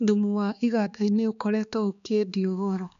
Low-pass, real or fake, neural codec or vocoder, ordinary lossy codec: 7.2 kHz; fake; codec, 16 kHz, 2 kbps, X-Codec, HuBERT features, trained on balanced general audio; none